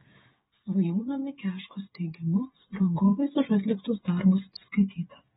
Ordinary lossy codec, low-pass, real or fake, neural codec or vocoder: AAC, 16 kbps; 7.2 kHz; fake; codec, 16 kHz, 8 kbps, FreqCodec, smaller model